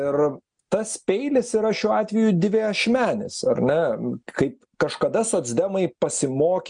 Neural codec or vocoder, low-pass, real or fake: none; 9.9 kHz; real